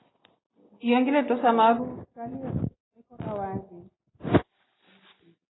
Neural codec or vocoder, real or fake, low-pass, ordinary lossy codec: none; real; 7.2 kHz; AAC, 16 kbps